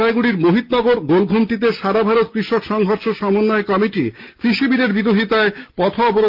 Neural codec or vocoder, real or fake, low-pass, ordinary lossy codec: none; real; 5.4 kHz; Opus, 24 kbps